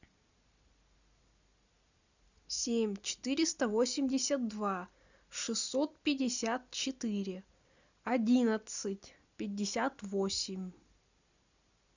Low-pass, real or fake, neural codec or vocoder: 7.2 kHz; real; none